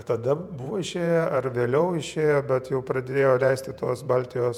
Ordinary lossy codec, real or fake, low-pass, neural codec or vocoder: MP3, 96 kbps; fake; 19.8 kHz; vocoder, 48 kHz, 128 mel bands, Vocos